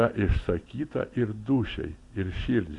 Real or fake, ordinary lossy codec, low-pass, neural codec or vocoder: fake; AAC, 48 kbps; 10.8 kHz; vocoder, 44.1 kHz, 128 mel bands every 256 samples, BigVGAN v2